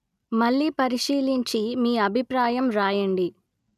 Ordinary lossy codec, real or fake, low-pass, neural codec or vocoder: none; real; 14.4 kHz; none